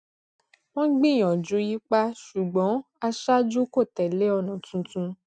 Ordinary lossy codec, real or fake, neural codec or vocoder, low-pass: none; real; none; 9.9 kHz